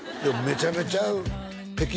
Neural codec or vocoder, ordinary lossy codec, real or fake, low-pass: none; none; real; none